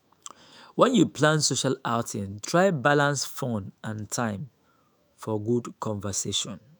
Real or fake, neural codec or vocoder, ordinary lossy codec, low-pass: fake; autoencoder, 48 kHz, 128 numbers a frame, DAC-VAE, trained on Japanese speech; none; none